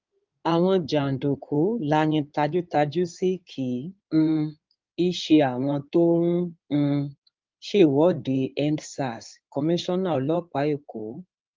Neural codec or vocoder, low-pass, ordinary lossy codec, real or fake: codec, 16 kHz in and 24 kHz out, 2.2 kbps, FireRedTTS-2 codec; 7.2 kHz; Opus, 32 kbps; fake